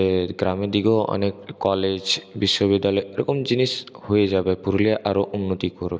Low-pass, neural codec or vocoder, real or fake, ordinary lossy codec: none; none; real; none